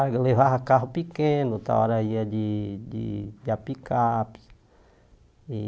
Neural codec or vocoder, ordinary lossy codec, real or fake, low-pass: none; none; real; none